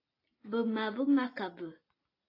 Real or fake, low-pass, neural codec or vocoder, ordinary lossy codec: real; 5.4 kHz; none; AAC, 24 kbps